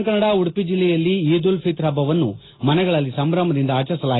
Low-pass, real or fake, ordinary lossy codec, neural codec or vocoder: 7.2 kHz; real; AAC, 16 kbps; none